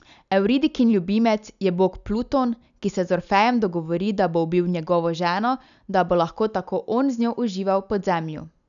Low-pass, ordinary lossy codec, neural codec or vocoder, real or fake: 7.2 kHz; none; none; real